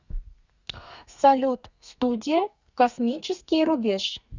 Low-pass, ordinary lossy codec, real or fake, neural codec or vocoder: 7.2 kHz; Opus, 64 kbps; fake; codec, 32 kHz, 1.9 kbps, SNAC